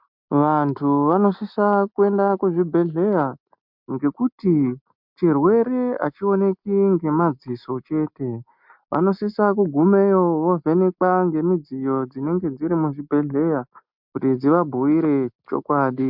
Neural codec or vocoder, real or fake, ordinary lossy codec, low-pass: none; real; MP3, 48 kbps; 5.4 kHz